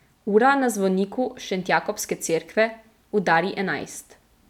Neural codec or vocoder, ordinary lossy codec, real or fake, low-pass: none; none; real; 19.8 kHz